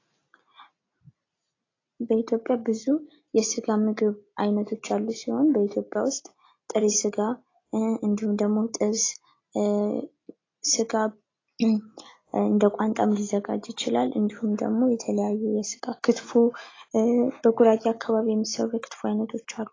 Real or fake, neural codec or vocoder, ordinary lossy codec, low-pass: real; none; AAC, 32 kbps; 7.2 kHz